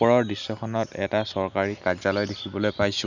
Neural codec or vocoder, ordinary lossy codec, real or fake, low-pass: none; none; real; 7.2 kHz